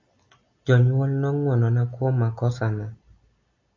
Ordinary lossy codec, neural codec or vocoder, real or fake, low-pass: MP3, 64 kbps; none; real; 7.2 kHz